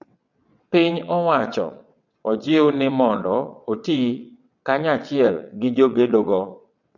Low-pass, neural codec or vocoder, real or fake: 7.2 kHz; vocoder, 22.05 kHz, 80 mel bands, WaveNeXt; fake